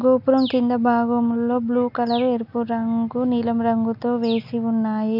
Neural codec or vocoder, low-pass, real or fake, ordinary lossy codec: none; 5.4 kHz; real; AAC, 48 kbps